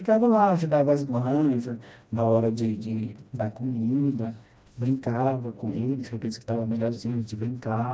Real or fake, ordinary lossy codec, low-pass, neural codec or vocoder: fake; none; none; codec, 16 kHz, 1 kbps, FreqCodec, smaller model